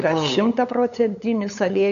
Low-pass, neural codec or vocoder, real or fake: 7.2 kHz; codec, 16 kHz, 8 kbps, FunCodec, trained on LibriTTS, 25 frames a second; fake